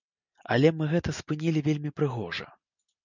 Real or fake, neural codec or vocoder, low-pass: real; none; 7.2 kHz